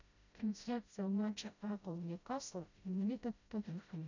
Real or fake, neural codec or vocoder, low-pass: fake; codec, 16 kHz, 0.5 kbps, FreqCodec, smaller model; 7.2 kHz